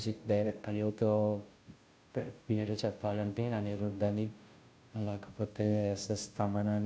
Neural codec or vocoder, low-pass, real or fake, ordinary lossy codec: codec, 16 kHz, 0.5 kbps, FunCodec, trained on Chinese and English, 25 frames a second; none; fake; none